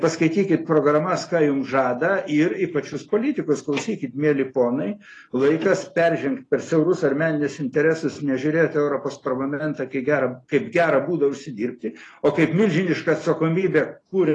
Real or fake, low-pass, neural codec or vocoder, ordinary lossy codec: real; 10.8 kHz; none; AAC, 32 kbps